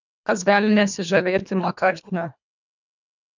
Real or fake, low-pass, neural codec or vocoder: fake; 7.2 kHz; codec, 24 kHz, 1.5 kbps, HILCodec